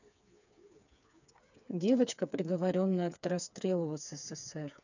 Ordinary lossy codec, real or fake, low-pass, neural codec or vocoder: none; fake; 7.2 kHz; codec, 16 kHz, 4 kbps, FreqCodec, smaller model